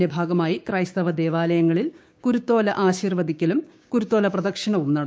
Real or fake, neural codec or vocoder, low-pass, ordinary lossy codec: fake; codec, 16 kHz, 6 kbps, DAC; none; none